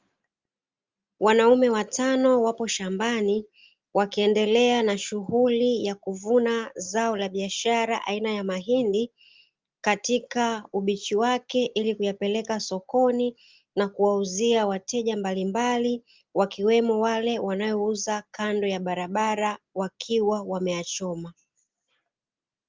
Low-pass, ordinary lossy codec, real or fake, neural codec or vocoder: 7.2 kHz; Opus, 32 kbps; real; none